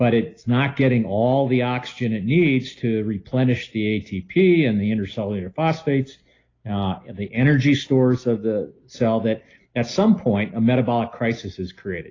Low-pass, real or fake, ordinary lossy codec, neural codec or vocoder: 7.2 kHz; real; AAC, 32 kbps; none